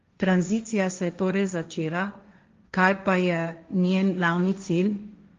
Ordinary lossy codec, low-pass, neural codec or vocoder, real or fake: Opus, 32 kbps; 7.2 kHz; codec, 16 kHz, 1.1 kbps, Voila-Tokenizer; fake